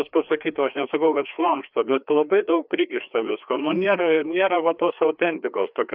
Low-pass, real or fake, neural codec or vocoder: 5.4 kHz; fake; codec, 16 kHz, 2 kbps, FreqCodec, larger model